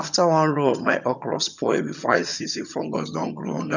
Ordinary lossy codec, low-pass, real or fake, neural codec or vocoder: none; 7.2 kHz; fake; vocoder, 22.05 kHz, 80 mel bands, HiFi-GAN